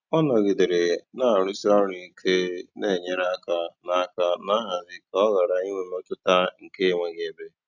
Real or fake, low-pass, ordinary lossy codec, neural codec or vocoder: real; 7.2 kHz; none; none